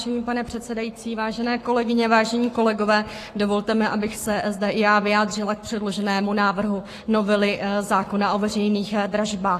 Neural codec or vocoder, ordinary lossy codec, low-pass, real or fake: codec, 44.1 kHz, 7.8 kbps, Pupu-Codec; AAC, 48 kbps; 14.4 kHz; fake